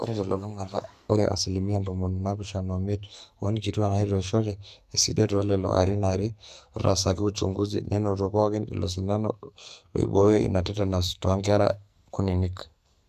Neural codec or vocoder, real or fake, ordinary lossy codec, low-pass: codec, 44.1 kHz, 2.6 kbps, SNAC; fake; none; 14.4 kHz